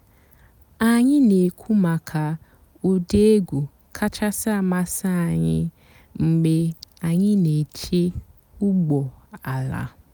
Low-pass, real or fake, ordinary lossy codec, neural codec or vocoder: none; real; none; none